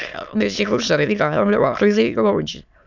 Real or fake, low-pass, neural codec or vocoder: fake; 7.2 kHz; autoencoder, 22.05 kHz, a latent of 192 numbers a frame, VITS, trained on many speakers